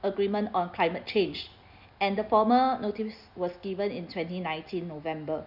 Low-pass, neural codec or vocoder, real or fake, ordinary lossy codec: 5.4 kHz; none; real; none